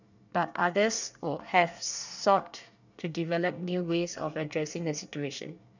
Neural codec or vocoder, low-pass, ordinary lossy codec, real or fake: codec, 24 kHz, 1 kbps, SNAC; 7.2 kHz; none; fake